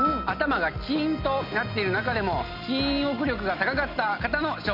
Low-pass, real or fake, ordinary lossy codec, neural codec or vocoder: 5.4 kHz; real; none; none